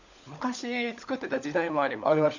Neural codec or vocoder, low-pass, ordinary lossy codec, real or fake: codec, 16 kHz, 16 kbps, FunCodec, trained on LibriTTS, 50 frames a second; 7.2 kHz; none; fake